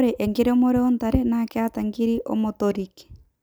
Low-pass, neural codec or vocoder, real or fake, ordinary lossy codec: none; none; real; none